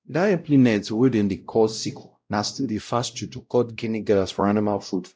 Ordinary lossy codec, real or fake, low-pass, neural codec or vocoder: none; fake; none; codec, 16 kHz, 0.5 kbps, X-Codec, WavLM features, trained on Multilingual LibriSpeech